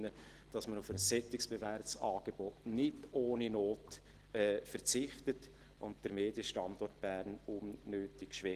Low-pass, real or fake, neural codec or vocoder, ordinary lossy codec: 14.4 kHz; real; none; Opus, 16 kbps